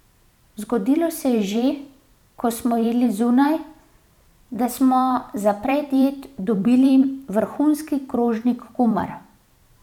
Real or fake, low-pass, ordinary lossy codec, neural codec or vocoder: fake; 19.8 kHz; none; vocoder, 44.1 kHz, 128 mel bands every 512 samples, BigVGAN v2